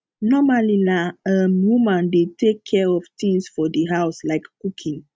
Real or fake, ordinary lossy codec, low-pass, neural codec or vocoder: real; none; none; none